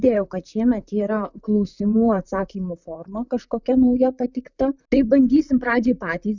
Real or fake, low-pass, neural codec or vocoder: fake; 7.2 kHz; vocoder, 44.1 kHz, 128 mel bands, Pupu-Vocoder